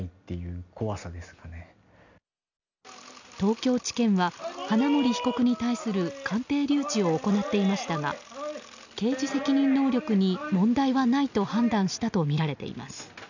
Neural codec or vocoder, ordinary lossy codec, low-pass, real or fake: none; none; 7.2 kHz; real